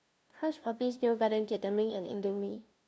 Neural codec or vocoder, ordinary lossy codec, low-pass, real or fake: codec, 16 kHz, 0.5 kbps, FunCodec, trained on LibriTTS, 25 frames a second; none; none; fake